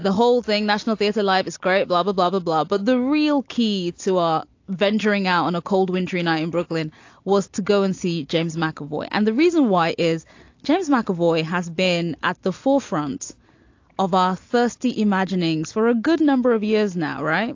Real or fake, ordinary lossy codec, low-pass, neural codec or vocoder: real; AAC, 48 kbps; 7.2 kHz; none